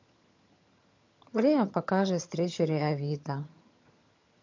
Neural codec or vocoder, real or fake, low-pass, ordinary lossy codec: vocoder, 22.05 kHz, 80 mel bands, HiFi-GAN; fake; 7.2 kHz; MP3, 48 kbps